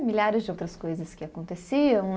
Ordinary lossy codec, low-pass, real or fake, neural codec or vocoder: none; none; real; none